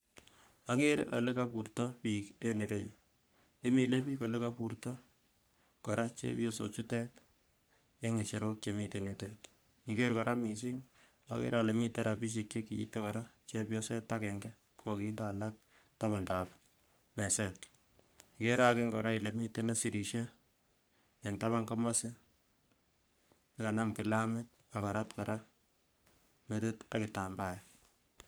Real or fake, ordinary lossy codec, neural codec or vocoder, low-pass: fake; none; codec, 44.1 kHz, 3.4 kbps, Pupu-Codec; none